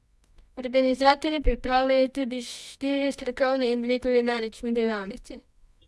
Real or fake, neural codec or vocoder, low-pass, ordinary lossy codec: fake; codec, 24 kHz, 0.9 kbps, WavTokenizer, medium music audio release; none; none